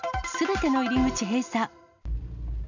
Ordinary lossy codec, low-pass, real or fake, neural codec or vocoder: none; 7.2 kHz; real; none